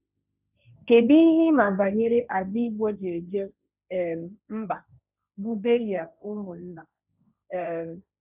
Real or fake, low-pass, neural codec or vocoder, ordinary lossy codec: fake; 3.6 kHz; codec, 16 kHz, 1.1 kbps, Voila-Tokenizer; none